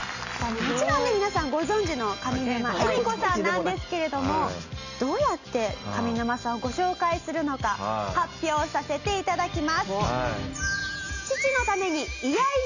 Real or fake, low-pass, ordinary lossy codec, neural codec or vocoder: real; 7.2 kHz; none; none